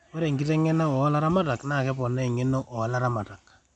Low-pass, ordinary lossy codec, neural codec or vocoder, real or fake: none; none; none; real